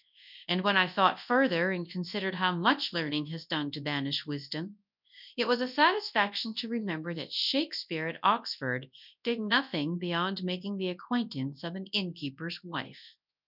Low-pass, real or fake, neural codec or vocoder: 5.4 kHz; fake; codec, 24 kHz, 0.9 kbps, WavTokenizer, large speech release